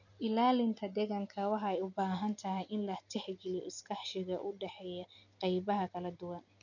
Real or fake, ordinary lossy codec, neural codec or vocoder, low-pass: real; none; none; 7.2 kHz